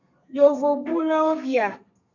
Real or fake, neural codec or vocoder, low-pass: fake; codec, 32 kHz, 1.9 kbps, SNAC; 7.2 kHz